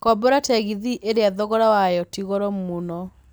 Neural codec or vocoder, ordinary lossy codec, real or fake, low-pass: none; none; real; none